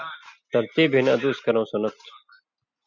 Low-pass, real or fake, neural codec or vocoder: 7.2 kHz; real; none